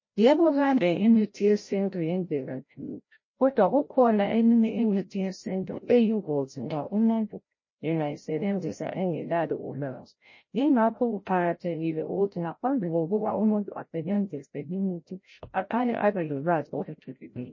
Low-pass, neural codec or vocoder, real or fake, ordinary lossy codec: 7.2 kHz; codec, 16 kHz, 0.5 kbps, FreqCodec, larger model; fake; MP3, 32 kbps